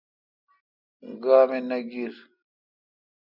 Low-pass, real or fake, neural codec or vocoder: 5.4 kHz; real; none